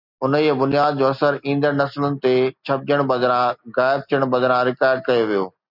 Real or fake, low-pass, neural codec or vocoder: real; 5.4 kHz; none